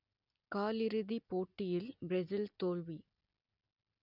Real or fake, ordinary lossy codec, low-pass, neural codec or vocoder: real; none; 5.4 kHz; none